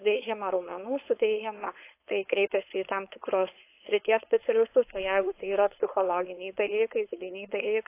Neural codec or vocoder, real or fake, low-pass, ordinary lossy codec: codec, 16 kHz, 4.8 kbps, FACodec; fake; 3.6 kHz; AAC, 24 kbps